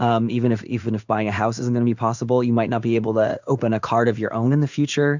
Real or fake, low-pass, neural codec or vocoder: fake; 7.2 kHz; codec, 16 kHz in and 24 kHz out, 1 kbps, XY-Tokenizer